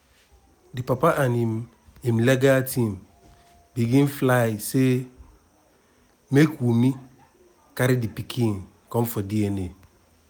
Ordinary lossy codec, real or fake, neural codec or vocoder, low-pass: none; real; none; none